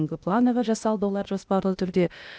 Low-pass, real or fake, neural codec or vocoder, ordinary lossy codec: none; fake; codec, 16 kHz, 0.8 kbps, ZipCodec; none